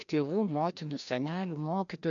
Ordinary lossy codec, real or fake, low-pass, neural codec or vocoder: MP3, 64 kbps; fake; 7.2 kHz; codec, 16 kHz, 1 kbps, FreqCodec, larger model